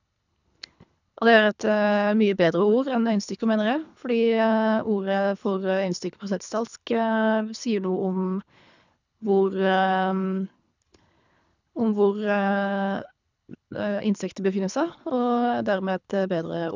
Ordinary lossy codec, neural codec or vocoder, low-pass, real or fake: none; codec, 24 kHz, 3 kbps, HILCodec; 7.2 kHz; fake